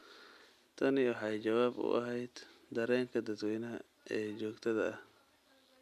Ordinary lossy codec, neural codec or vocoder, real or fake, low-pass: MP3, 96 kbps; none; real; 14.4 kHz